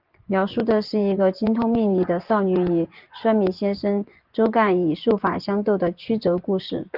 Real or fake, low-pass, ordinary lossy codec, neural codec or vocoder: fake; 5.4 kHz; Opus, 32 kbps; vocoder, 24 kHz, 100 mel bands, Vocos